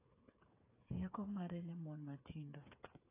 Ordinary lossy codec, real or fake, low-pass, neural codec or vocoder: AAC, 24 kbps; fake; 3.6 kHz; codec, 16 kHz, 8 kbps, FreqCodec, smaller model